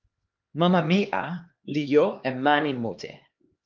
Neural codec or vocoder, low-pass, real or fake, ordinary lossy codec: codec, 16 kHz, 2 kbps, X-Codec, HuBERT features, trained on LibriSpeech; 7.2 kHz; fake; Opus, 32 kbps